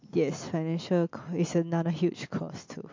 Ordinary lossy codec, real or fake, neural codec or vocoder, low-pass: MP3, 48 kbps; real; none; 7.2 kHz